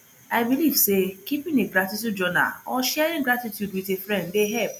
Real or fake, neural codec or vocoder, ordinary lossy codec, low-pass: real; none; none; none